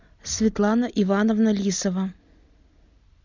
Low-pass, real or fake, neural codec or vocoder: 7.2 kHz; real; none